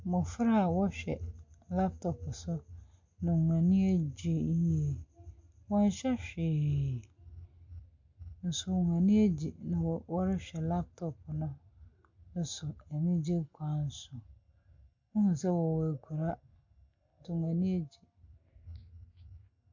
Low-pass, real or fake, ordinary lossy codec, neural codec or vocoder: 7.2 kHz; real; MP3, 48 kbps; none